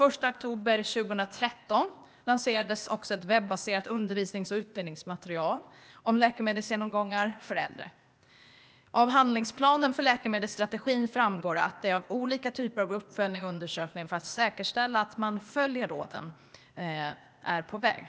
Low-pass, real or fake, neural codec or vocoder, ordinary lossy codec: none; fake; codec, 16 kHz, 0.8 kbps, ZipCodec; none